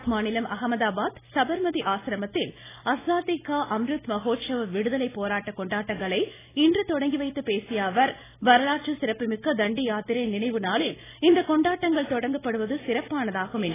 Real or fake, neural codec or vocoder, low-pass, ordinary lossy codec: real; none; 3.6 kHz; AAC, 16 kbps